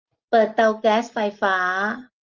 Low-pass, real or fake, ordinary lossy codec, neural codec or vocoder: 7.2 kHz; real; Opus, 32 kbps; none